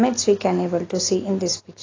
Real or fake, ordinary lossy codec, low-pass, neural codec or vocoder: real; AAC, 32 kbps; 7.2 kHz; none